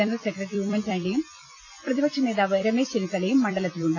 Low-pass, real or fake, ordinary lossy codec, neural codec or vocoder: 7.2 kHz; fake; none; vocoder, 44.1 kHz, 128 mel bands every 256 samples, BigVGAN v2